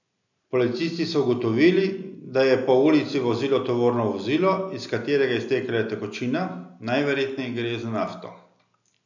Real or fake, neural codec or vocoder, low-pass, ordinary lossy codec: real; none; 7.2 kHz; none